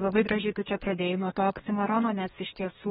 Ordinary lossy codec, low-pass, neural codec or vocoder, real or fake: AAC, 16 kbps; 14.4 kHz; codec, 32 kHz, 1.9 kbps, SNAC; fake